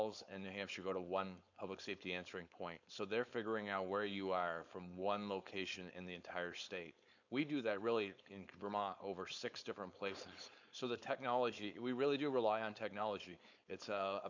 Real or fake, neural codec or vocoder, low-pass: fake; codec, 16 kHz, 4.8 kbps, FACodec; 7.2 kHz